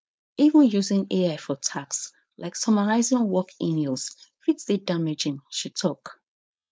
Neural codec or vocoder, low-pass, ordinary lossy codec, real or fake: codec, 16 kHz, 4.8 kbps, FACodec; none; none; fake